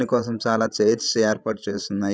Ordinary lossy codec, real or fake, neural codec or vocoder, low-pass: none; fake; codec, 16 kHz, 16 kbps, FreqCodec, larger model; none